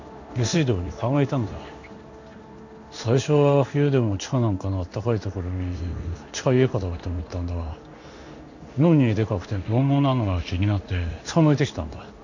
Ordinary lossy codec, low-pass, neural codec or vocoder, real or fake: none; 7.2 kHz; codec, 16 kHz in and 24 kHz out, 1 kbps, XY-Tokenizer; fake